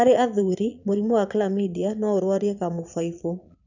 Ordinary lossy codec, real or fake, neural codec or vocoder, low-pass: none; fake; vocoder, 22.05 kHz, 80 mel bands, Vocos; 7.2 kHz